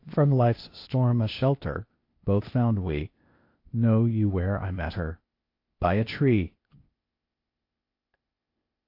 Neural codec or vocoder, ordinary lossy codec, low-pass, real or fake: codec, 16 kHz, 0.8 kbps, ZipCodec; MP3, 32 kbps; 5.4 kHz; fake